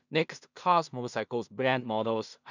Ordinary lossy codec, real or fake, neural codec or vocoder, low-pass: none; fake; codec, 16 kHz in and 24 kHz out, 0.4 kbps, LongCat-Audio-Codec, two codebook decoder; 7.2 kHz